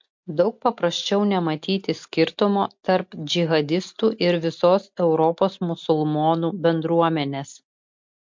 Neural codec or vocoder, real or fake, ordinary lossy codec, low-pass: none; real; MP3, 48 kbps; 7.2 kHz